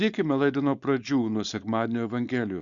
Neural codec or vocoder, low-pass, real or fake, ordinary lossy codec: codec, 16 kHz, 4.8 kbps, FACodec; 7.2 kHz; fake; Opus, 64 kbps